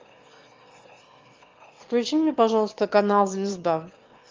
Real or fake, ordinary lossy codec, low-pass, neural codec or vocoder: fake; Opus, 32 kbps; 7.2 kHz; autoencoder, 22.05 kHz, a latent of 192 numbers a frame, VITS, trained on one speaker